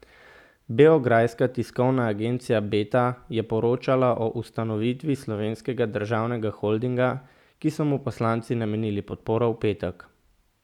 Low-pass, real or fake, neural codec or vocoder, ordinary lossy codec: 19.8 kHz; real; none; none